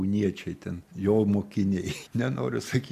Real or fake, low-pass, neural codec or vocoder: real; 14.4 kHz; none